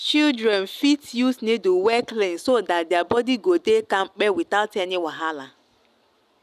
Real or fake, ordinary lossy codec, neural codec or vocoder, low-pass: real; none; none; 14.4 kHz